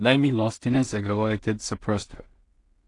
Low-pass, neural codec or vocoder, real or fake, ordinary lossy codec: 10.8 kHz; codec, 16 kHz in and 24 kHz out, 0.4 kbps, LongCat-Audio-Codec, two codebook decoder; fake; AAC, 48 kbps